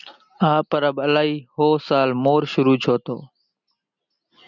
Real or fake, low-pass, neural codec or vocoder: real; 7.2 kHz; none